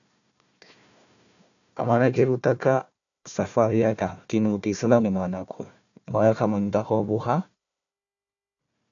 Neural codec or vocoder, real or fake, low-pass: codec, 16 kHz, 1 kbps, FunCodec, trained on Chinese and English, 50 frames a second; fake; 7.2 kHz